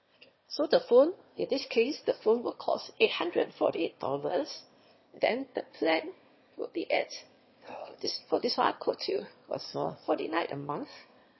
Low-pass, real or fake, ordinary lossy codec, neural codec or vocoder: 7.2 kHz; fake; MP3, 24 kbps; autoencoder, 22.05 kHz, a latent of 192 numbers a frame, VITS, trained on one speaker